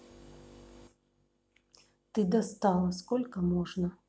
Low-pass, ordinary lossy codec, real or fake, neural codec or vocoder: none; none; real; none